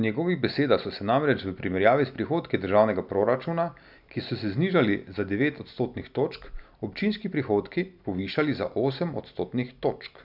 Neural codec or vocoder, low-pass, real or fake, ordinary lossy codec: none; 5.4 kHz; real; none